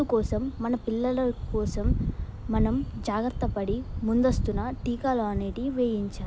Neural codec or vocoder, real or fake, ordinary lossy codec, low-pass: none; real; none; none